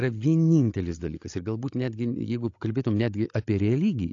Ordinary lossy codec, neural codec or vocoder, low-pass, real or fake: AAC, 48 kbps; codec, 16 kHz, 16 kbps, FreqCodec, larger model; 7.2 kHz; fake